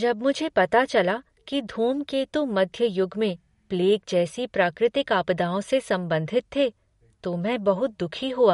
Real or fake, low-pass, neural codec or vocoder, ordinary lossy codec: real; 19.8 kHz; none; MP3, 48 kbps